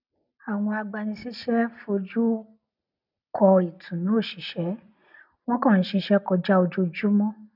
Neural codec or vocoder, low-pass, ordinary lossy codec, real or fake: none; 5.4 kHz; none; real